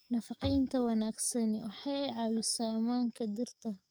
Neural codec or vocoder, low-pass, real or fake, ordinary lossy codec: codec, 44.1 kHz, 7.8 kbps, DAC; none; fake; none